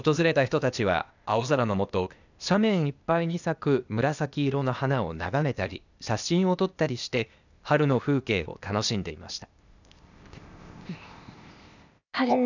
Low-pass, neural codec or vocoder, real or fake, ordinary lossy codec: 7.2 kHz; codec, 16 kHz, 0.8 kbps, ZipCodec; fake; none